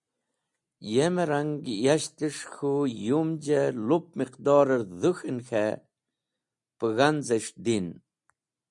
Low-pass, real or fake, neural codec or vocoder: 10.8 kHz; real; none